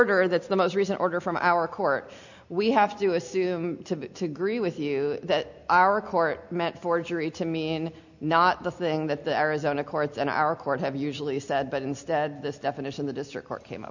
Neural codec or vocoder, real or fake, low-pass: none; real; 7.2 kHz